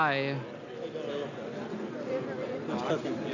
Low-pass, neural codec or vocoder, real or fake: 7.2 kHz; none; real